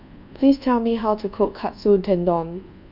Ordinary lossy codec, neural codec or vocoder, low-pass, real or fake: none; codec, 24 kHz, 1.2 kbps, DualCodec; 5.4 kHz; fake